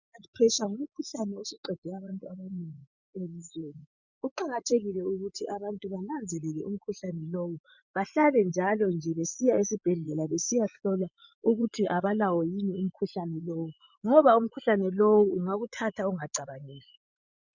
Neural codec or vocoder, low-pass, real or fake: vocoder, 24 kHz, 100 mel bands, Vocos; 7.2 kHz; fake